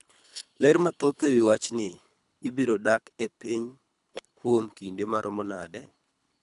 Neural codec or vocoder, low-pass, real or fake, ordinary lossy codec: codec, 24 kHz, 3 kbps, HILCodec; 10.8 kHz; fake; none